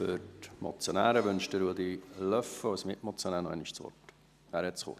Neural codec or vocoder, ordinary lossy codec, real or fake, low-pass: none; none; real; 14.4 kHz